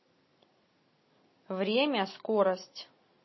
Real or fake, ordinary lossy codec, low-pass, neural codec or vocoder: real; MP3, 24 kbps; 7.2 kHz; none